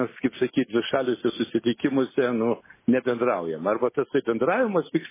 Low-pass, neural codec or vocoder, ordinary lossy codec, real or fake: 3.6 kHz; none; MP3, 16 kbps; real